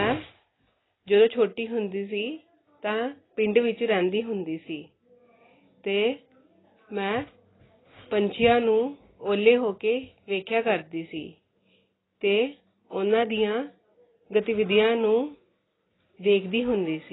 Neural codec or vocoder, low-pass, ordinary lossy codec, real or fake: none; 7.2 kHz; AAC, 16 kbps; real